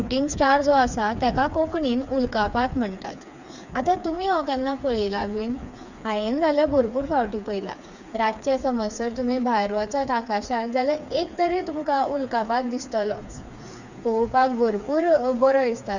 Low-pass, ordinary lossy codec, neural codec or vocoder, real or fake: 7.2 kHz; none; codec, 16 kHz, 4 kbps, FreqCodec, smaller model; fake